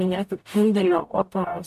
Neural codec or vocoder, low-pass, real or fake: codec, 44.1 kHz, 0.9 kbps, DAC; 14.4 kHz; fake